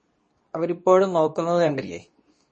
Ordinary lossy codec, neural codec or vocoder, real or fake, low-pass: MP3, 32 kbps; codec, 24 kHz, 0.9 kbps, WavTokenizer, medium speech release version 2; fake; 10.8 kHz